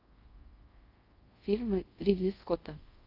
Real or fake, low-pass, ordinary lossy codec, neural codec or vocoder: fake; 5.4 kHz; Opus, 24 kbps; codec, 24 kHz, 0.5 kbps, DualCodec